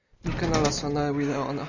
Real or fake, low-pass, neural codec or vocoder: real; 7.2 kHz; none